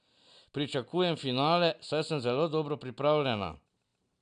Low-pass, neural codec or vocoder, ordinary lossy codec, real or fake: 9.9 kHz; none; none; real